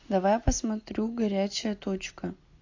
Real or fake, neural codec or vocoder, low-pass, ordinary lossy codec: real; none; 7.2 kHz; AAC, 48 kbps